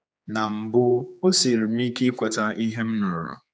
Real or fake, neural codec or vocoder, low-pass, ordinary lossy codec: fake; codec, 16 kHz, 4 kbps, X-Codec, HuBERT features, trained on general audio; none; none